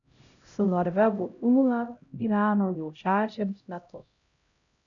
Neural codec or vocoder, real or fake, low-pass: codec, 16 kHz, 0.5 kbps, X-Codec, HuBERT features, trained on LibriSpeech; fake; 7.2 kHz